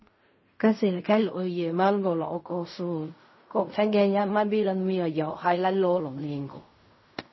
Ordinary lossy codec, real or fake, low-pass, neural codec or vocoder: MP3, 24 kbps; fake; 7.2 kHz; codec, 16 kHz in and 24 kHz out, 0.4 kbps, LongCat-Audio-Codec, fine tuned four codebook decoder